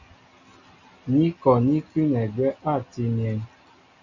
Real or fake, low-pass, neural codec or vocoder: real; 7.2 kHz; none